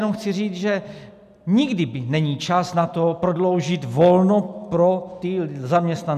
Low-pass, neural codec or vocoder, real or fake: 14.4 kHz; none; real